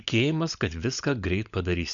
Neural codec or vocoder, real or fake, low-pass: codec, 16 kHz, 16 kbps, FunCodec, trained on LibriTTS, 50 frames a second; fake; 7.2 kHz